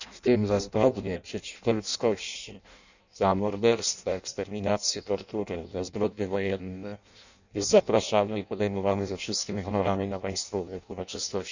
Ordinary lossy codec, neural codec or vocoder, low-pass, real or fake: none; codec, 16 kHz in and 24 kHz out, 0.6 kbps, FireRedTTS-2 codec; 7.2 kHz; fake